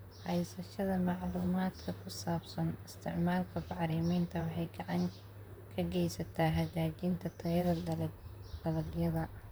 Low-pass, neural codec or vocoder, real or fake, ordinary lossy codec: none; vocoder, 44.1 kHz, 128 mel bands, Pupu-Vocoder; fake; none